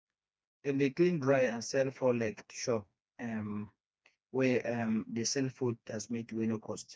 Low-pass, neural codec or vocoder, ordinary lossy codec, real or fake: none; codec, 16 kHz, 2 kbps, FreqCodec, smaller model; none; fake